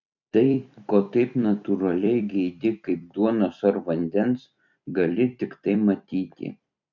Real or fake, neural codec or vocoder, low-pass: fake; vocoder, 44.1 kHz, 128 mel bands every 256 samples, BigVGAN v2; 7.2 kHz